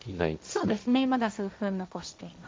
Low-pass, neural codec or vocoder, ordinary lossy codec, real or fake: none; codec, 16 kHz, 1.1 kbps, Voila-Tokenizer; none; fake